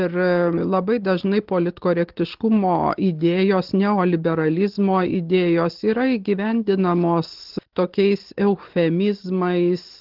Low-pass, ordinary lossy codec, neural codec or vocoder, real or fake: 5.4 kHz; Opus, 16 kbps; none; real